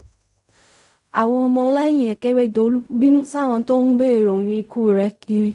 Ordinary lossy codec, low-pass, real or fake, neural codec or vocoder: none; 10.8 kHz; fake; codec, 16 kHz in and 24 kHz out, 0.4 kbps, LongCat-Audio-Codec, fine tuned four codebook decoder